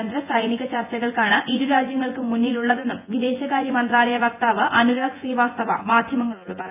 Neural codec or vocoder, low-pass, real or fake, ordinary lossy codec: vocoder, 24 kHz, 100 mel bands, Vocos; 3.6 kHz; fake; AAC, 32 kbps